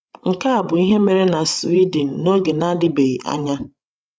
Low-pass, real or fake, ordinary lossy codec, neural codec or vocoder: none; fake; none; codec, 16 kHz, 16 kbps, FreqCodec, larger model